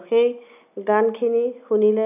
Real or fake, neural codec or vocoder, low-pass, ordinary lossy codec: real; none; 3.6 kHz; none